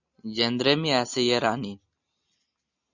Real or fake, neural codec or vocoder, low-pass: real; none; 7.2 kHz